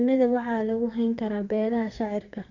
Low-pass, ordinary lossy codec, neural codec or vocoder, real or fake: 7.2 kHz; none; codec, 32 kHz, 1.9 kbps, SNAC; fake